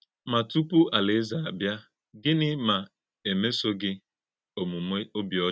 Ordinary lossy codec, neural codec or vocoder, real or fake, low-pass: none; none; real; none